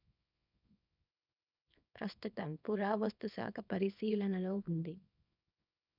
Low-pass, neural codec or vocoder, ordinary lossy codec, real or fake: 5.4 kHz; codec, 24 kHz, 0.9 kbps, WavTokenizer, small release; none; fake